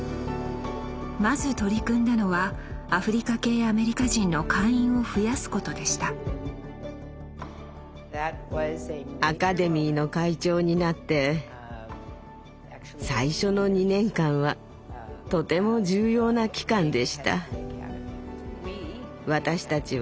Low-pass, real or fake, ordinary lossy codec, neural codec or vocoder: none; real; none; none